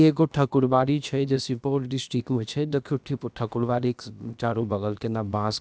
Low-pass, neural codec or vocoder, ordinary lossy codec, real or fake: none; codec, 16 kHz, about 1 kbps, DyCAST, with the encoder's durations; none; fake